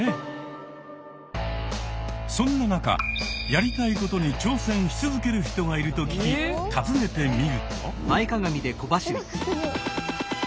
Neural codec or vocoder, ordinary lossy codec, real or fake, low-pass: none; none; real; none